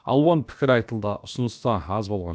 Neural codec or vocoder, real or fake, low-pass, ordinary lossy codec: codec, 16 kHz, about 1 kbps, DyCAST, with the encoder's durations; fake; none; none